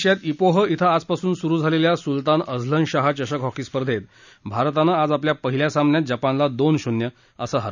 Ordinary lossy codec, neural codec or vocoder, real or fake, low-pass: none; none; real; 7.2 kHz